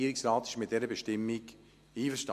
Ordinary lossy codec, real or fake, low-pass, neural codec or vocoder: MP3, 64 kbps; real; 14.4 kHz; none